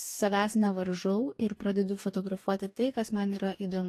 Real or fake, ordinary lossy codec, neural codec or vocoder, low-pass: fake; AAC, 64 kbps; codec, 44.1 kHz, 2.6 kbps, DAC; 14.4 kHz